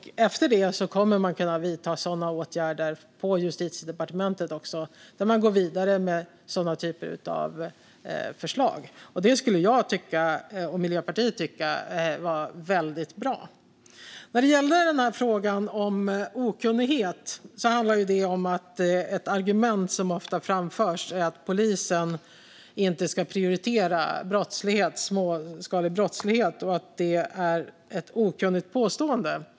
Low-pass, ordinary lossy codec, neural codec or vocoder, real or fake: none; none; none; real